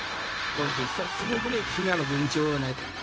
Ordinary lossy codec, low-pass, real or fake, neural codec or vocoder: none; none; fake; codec, 16 kHz, 0.4 kbps, LongCat-Audio-Codec